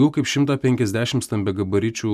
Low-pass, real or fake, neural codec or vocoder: 14.4 kHz; real; none